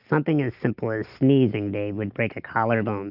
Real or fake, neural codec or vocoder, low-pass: real; none; 5.4 kHz